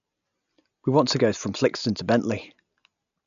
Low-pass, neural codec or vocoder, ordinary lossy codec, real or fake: 7.2 kHz; none; none; real